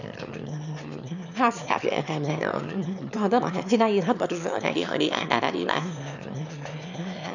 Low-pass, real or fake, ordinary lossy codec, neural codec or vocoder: 7.2 kHz; fake; none; autoencoder, 22.05 kHz, a latent of 192 numbers a frame, VITS, trained on one speaker